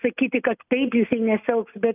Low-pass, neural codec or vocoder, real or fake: 3.6 kHz; none; real